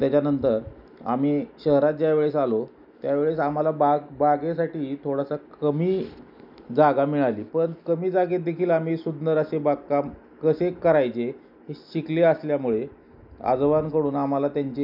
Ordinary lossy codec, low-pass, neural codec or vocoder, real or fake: none; 5.4 kHz; none; real